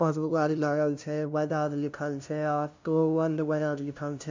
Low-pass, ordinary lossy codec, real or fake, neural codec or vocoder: 7.2 kHz; MP3, 64 kbps; fake; codec, 16 kHz, 0.5 kbps, FunCodec, trained on LibriTTS, 25 frames a second